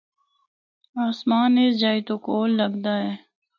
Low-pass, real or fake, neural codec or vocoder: 7.2 kHz; real; none